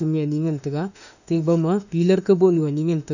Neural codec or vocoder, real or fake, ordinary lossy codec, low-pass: autoencoder, 48 kHz, 32 numbers a frame, DAC-VAE, trained on Japanese speech; fake; none; 7.2 kHz